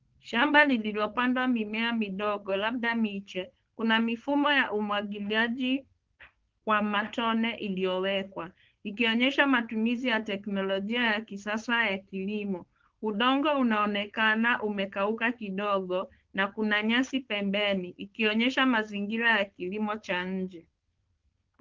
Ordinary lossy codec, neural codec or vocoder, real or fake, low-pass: Opus, 16 kbps; codec, 16 kHz, 4.8 kbps, FACodec; fake; 7.2 kHz